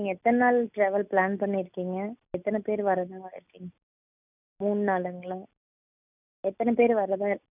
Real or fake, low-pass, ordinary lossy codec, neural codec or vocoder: real; 3.6 kHz; none; none